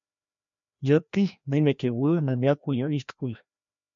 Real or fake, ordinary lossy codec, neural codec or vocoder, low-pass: fake; MP3, 64 kbps; codec, 16 kHz, 1 kbps, FreqCodec, larger model; 7.2 kHz